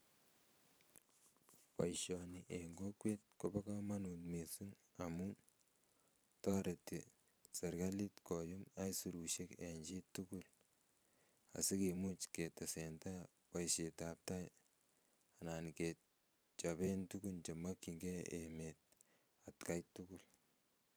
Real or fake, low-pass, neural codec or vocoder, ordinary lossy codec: fake; none; vocoder, 44.1 kHz, 128 mel bands every 512 samples, BigVGAN v2; none